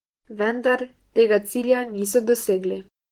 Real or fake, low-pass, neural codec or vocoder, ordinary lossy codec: fake; 19.8 kHz; vocoder, 44.1 kHz, 128 mel bands, Pupu-Vocoder; Opus, 16 kbps